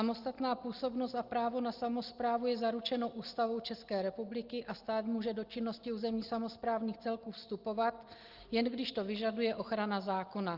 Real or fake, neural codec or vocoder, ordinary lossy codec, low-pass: real; none; Opus, 32 kbps; 5.4 kHz